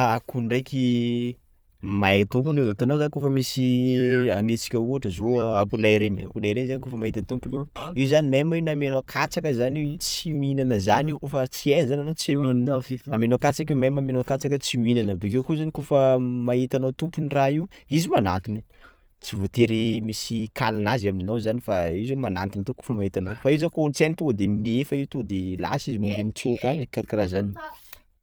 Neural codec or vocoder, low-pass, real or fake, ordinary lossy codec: vocoder, 44.1 kHz, 128 mel bands every 256 samples, BigVGAN v2; none; fake; none